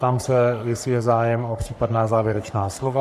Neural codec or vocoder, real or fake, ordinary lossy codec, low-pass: codec, 44.1 kHz, 3.4 kbps, Pupu-Codec; fake; AAC, 96 kbps; 14.4 kHz